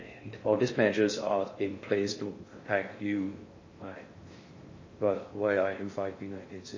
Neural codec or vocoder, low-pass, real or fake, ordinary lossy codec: codec, 16 kHz in and 24 kHz out, 0.6 kbps, FocalCodec, streaming, 4096 codes; 7.2 kHz; fake; MP3, 32 kbps